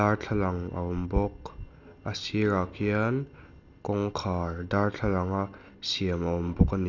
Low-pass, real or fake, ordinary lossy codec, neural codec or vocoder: 7.2 kHz; real; none; none